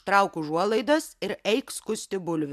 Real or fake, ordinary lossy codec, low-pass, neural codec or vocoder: real; AAC, 96 kbps; 14.4 kHz; none